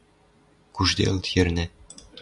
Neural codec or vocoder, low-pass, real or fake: none; 10.8 kHz; real